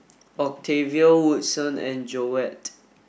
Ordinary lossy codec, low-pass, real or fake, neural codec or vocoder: none; none; real; none